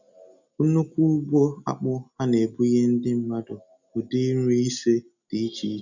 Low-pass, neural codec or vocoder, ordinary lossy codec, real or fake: 7.2 kHz; none; none; real